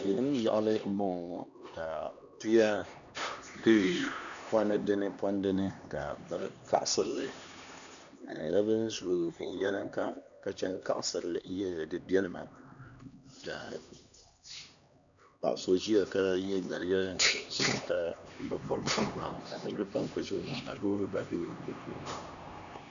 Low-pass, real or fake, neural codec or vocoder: 7.2 kHz; fake; codec, 16 kHz, 2 kbps, X-Codec, HuBERT features, trained on LibriSpeech